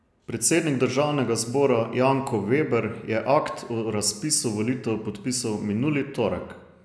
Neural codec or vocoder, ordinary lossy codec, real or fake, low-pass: none; none; real; none